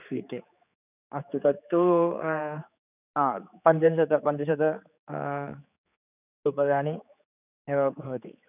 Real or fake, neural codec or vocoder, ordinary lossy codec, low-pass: fake; codec, 16 kHz, 4 kbps, X-Codec, HuBERT features, trained on general audio; none; 3.6 kHz